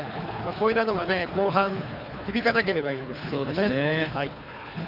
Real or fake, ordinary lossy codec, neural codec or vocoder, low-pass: fake; none; codec, 24 kHz, 3 kbps, HILCodec; 5.4 kHz